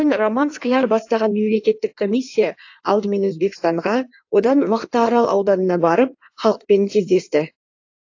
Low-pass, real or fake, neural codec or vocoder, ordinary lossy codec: 7.2 kHz; fake; codec, 16 kHz in and 24 kHz out, 1.1 kbps, FireRedTTS-2 codec; AAC, 48 kbps